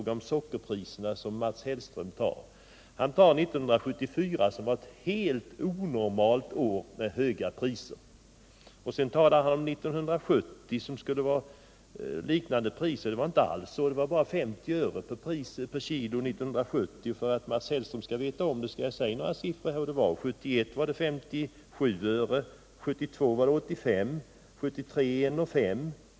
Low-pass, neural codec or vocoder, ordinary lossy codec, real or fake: none; none; none; real